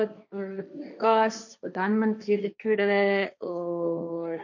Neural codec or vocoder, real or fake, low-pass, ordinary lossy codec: codec, 16 kHz, 1.1 kbps, Voila-Tokenizer; fake; 7.2 kHz; none